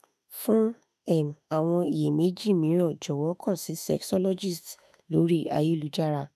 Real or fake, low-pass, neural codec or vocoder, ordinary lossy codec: fake; 14.4 kHz; autoencoder, 48 kHz, 32 numbers a frame, DAC-VAE, trained on Japanese speech; none